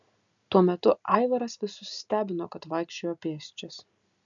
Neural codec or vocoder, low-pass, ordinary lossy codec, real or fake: none; 7.2 kHz; MP3, 96 kbps; real